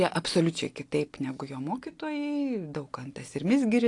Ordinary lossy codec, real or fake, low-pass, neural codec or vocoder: AAC, 48 kbps; real; 10.8 kHz; none